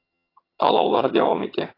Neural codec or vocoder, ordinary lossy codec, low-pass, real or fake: vocoder, 22.05 kHz, 80 mel bands, HiFi-GAN; AAC, 24 kbps; 5.4 kHz; fake